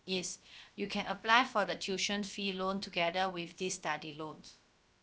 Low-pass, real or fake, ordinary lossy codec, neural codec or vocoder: none; fake; none; codec, 16 kHz, about 1 kbps, DyCAST, with the encoder's durations